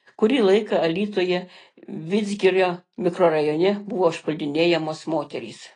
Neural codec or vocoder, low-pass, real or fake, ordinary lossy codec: none; 9.9 kHz; real; AAC, 32 kbps